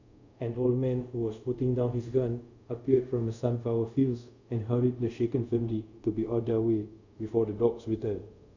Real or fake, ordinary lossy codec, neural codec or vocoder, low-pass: fake; none; codec, 24 kHz, 0.5 kbps, DualCodec; 7.2 kHz